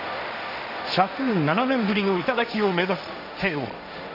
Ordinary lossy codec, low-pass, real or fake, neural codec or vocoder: none; 5.4 kHz; fake; codec, 16 kHz, 1.1 kbps, Voila-Tokenizer